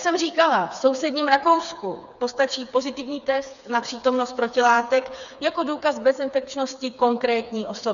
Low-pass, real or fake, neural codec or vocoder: 7.2 kHz; fake; codec, 16 kHz, 4 kbps, FreqCodec, smaller model